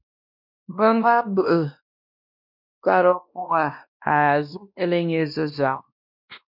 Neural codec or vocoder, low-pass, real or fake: codec, 16 kHz, 1 kbps, X-Codec, WavLM features, trained on Multilingual LibriSpeech; 5.4 kHz; fake